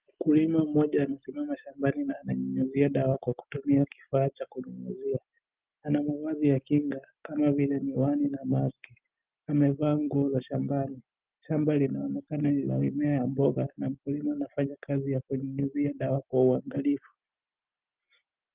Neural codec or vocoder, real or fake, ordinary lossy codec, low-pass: none; real; Opus, 24 kbps; 3.6 kHz